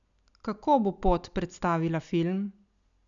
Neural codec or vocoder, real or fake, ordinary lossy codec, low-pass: none; real; none; 7.2 kHz